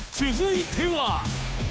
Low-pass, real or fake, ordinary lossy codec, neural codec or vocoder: none; fake; none; codec, 16 kHz, 2 kbps, FunCodec, trained on Chinese and English, 25 frames a second